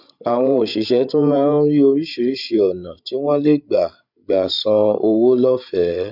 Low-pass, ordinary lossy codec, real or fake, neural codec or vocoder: 5.4 kHz; none; fake; codec, 16 kHz, 16 kbps, FreqCodec, larger model